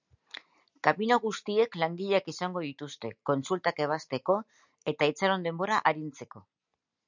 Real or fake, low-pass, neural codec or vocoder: real; 7.2 kHz; none